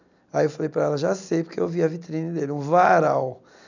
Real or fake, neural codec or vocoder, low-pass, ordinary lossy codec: real; none; 7.2 kHz; none